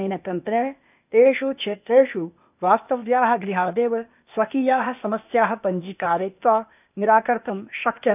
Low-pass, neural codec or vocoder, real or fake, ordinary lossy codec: 3.6 kHz; codec, 16 kHz, 0.8 kbps, ZipCodec; fake; none